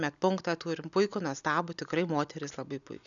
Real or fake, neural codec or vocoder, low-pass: real; none; 7.2 kHz